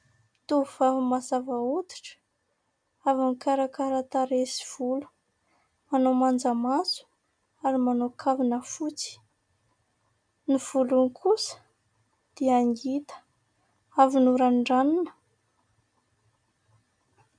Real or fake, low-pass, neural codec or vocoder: real; 9.9 kHz; none